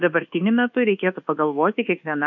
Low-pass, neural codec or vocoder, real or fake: 7.2 kHz; codec, 24 kHz, 1.2 kbps, DualCodec; fake